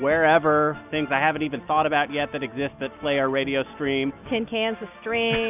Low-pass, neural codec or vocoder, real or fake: 3.6 kHz; none; real